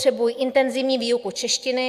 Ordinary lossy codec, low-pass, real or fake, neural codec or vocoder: AAC, 96 kbps; 14.4 kHz; real; none